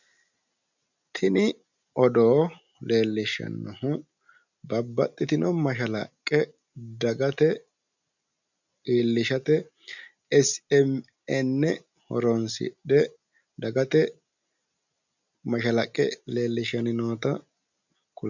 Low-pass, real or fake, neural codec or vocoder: 7.2 kHz; real; none